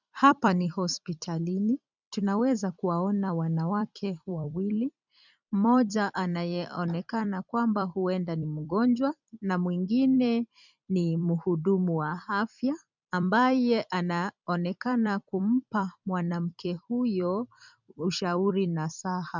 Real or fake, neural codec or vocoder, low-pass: fake; vocoder, 44.1 kHz, 128 mel bands every 256 samples, BigVGAN v2; 7.2 kHz